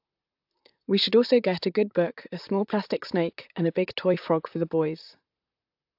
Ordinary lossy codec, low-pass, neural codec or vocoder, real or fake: AAC, 48 kbps; 5.4 kHz; vocoder, 44.1 kHz, 128 mel bands, Pupu-Vocoder; fake